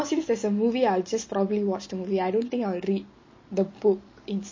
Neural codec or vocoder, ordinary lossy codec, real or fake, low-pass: none; MP3, 32 kbps; real; 7.2 kHz